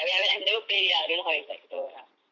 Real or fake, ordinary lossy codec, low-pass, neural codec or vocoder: fake; MP3, 48 kbps; 7.2 kHz; vocoder, 44.1 kHz, 80 mel bands, Vocos